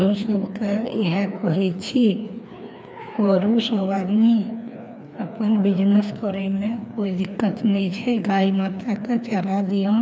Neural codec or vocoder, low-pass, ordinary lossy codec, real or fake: codec, 16 kHz, 2 kbps, FreqCodec, larger model; none; none; fake